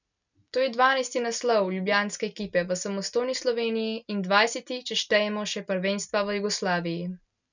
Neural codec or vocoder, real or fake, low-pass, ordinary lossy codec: none; real; 7.2 kHz; none